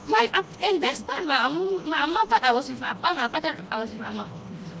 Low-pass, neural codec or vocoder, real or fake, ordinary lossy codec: none; codec, 16 kHz, 1 kbps, FreqCodec, smaller model; fake; none